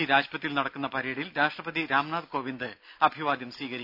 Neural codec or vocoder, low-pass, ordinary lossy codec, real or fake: none; 5.4 kHz; none; real